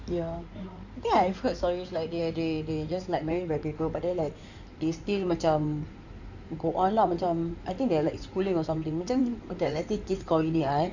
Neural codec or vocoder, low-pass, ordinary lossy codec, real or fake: codec, 16 kHz in and 24 kHz out, 2.2 kbps, FireRedTTS-2 codec; 7.2 kHz; none; fake